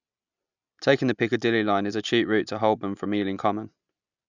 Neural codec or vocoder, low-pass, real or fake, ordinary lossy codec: none; 7.2 kHz; real; none